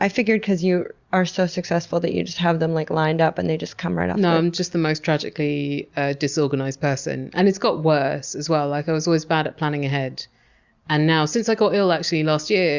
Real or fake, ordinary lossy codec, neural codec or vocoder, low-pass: real; Opus, 64 kbps; none; 7.2 kHz